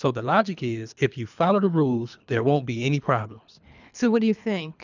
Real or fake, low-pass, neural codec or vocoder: fake; 7.2 kHz; codec, 24 kHz, 3 kbps, HILCodec